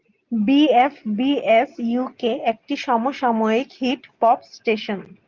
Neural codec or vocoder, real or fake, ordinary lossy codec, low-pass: none; real; Opus, 32 kbps; 7.2 kHz